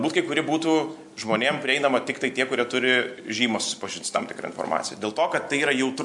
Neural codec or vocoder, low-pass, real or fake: none; 10.8 kHz; real